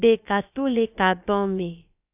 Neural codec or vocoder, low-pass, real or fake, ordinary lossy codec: codec, 16 kHz, about 1 kbps, DyCAST, with the encoder's durations; 3.6 kHz; fake; AAC, 32 kbps